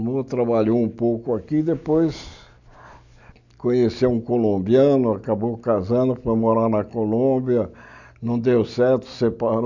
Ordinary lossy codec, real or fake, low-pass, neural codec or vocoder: none; real; 7.2 kHz; none